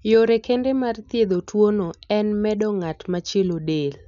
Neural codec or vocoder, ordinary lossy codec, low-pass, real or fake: none; none; 7.2 kHz; real